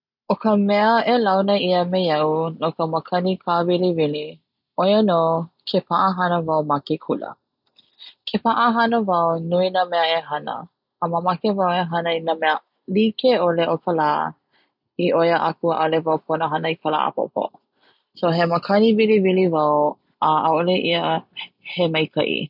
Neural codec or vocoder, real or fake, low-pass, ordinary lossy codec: none; real; 5.4 kHz; none